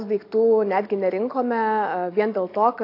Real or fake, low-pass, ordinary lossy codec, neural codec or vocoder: real; 5.4 kHz; AAC, 32 kbps; none